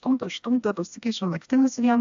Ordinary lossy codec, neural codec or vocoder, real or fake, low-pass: AAC, 64 kbps; codec, 16 kHz, 1 kbps, FreqCodec, smaller model; fake; 7.2 kHz